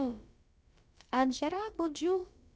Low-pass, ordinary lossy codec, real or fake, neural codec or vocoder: none; none; fake; codec, 16 kHz, about 1 kbps, DyCAST, with the encoder's durations